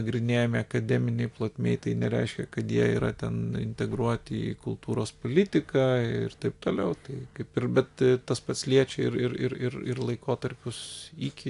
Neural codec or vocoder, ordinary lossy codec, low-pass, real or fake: none; AAC, 64 kbps; 10.8 kHz; real